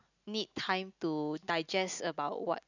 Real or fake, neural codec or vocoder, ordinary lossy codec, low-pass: real; none; none; 7.2 kHz